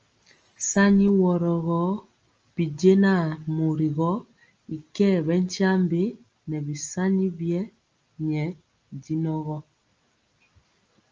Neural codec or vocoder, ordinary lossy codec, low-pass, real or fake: none; Opus, 24 kbps; 7.2 kHz; real